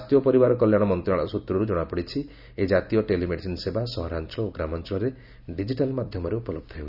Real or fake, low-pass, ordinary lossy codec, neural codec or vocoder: real; 5.4 kHz; none; none